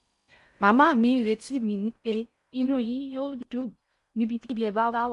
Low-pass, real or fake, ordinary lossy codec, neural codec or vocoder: 10.8 kHz; fake; MP3, 64 kbps; codec, 16 kHz in and 24 kHz out, 0.6 kbps, FocalCodec, streaming, 4096 codes